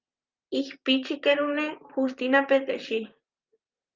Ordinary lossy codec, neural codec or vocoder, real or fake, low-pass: Opus, 24 kbps; vocoder, 44.1 kHz, 128 mel bands, Pupu-Vocoder; fake; 7.2 kHz